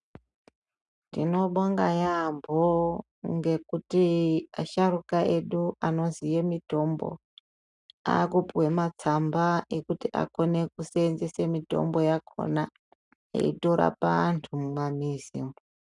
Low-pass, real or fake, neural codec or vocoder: 10.8 kHz; real; none